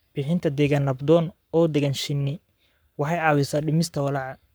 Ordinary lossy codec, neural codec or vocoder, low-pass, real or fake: none; codec, 44.1 kHz, 7.8 kbps, Pupu-Codec; none; fake